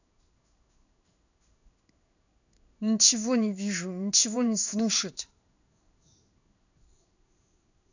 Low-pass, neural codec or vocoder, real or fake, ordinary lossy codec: 7.2 kHz; codec, 16 kHz in and 24 kHz out, 1 kbps, XY-Tokenizer; fake; none